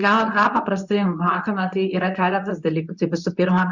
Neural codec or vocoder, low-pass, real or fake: codec, 24 kHz, 0.9 kbps, WavTokenizer, medium speech release version 2; 7.2 kHz; fake